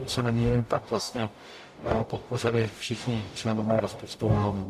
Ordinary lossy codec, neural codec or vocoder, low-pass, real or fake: AAC, 96 kbps; codec, 44.1 kHz, 0.9 kbps, DAC; 14.4 kHz; fake